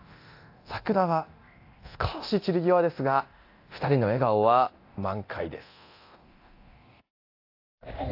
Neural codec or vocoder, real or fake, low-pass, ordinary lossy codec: codec, 24 kHz, 0.9 kbps, DualCodec; fake; 5.4 kHz; AAC, 32 kbps